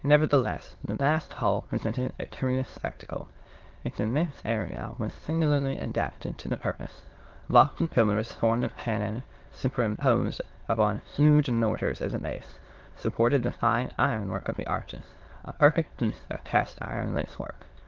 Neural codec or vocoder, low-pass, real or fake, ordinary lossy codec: autoencoder, 22.05 kHz, a latent of 192 numbers a frame, VITS, trained on many speakers; 7.2 kHz; fake; Opus, 16 kbps